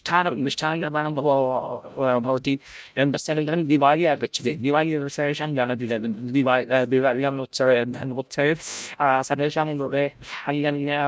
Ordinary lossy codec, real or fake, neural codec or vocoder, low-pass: none; fake; codec, 16 kHz, 0.5 kbps, FreqCodec, larger model; none